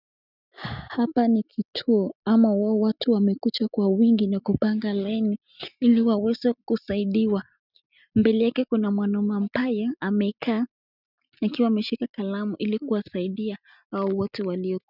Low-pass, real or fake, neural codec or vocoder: 5.4 kHz; real; none